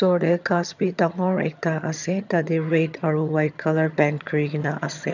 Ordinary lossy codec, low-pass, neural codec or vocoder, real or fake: none; 7.2 kHz; vocoder, 22.05 kHz, 80 mel bands, HiFi-GAN; fake